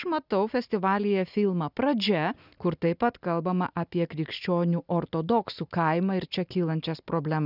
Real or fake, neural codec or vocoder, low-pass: real; none; 5.4 kHz